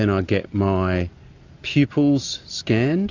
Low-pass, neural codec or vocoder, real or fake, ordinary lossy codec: 7.2 kHz; none; real; AAC, 48 kbps